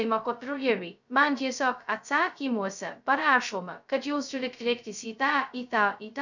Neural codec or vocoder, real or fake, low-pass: codec, 16 kHz, 0.2 kbps, FocalCodec; fake; 7.2 kHz